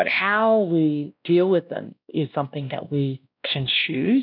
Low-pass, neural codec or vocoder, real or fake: 5.4 kHz; codec, 16 kHz, 1 kbps, X-Codec, WavLM features, trained on Multilingual LibriSpeech; fake